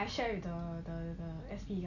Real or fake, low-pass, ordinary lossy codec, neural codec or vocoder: real; 7.2 kHz; none; none